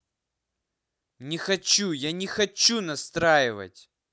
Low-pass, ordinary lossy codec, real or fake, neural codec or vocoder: none; none; real; none